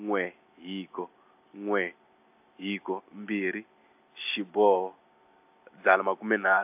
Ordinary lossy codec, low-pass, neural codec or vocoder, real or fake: AAC, 32 kbps; 3.6 kHz; none; real